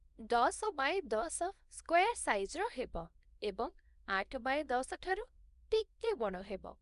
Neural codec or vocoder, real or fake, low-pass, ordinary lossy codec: codec, 24 kHz, 0.9 kbps, WavTokenizer, small release; fake; 10.8 kHz; none